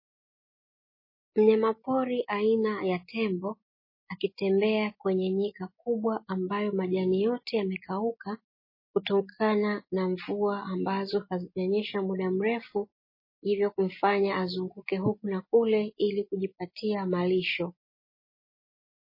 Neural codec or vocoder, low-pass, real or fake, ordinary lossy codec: none; 5.4 kHz; real; MP3, 24 kbps